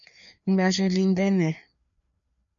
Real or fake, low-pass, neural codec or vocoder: fake; 7.2 kHz; codec, 16 kHz, 2 kbps, FreqCodec, larger model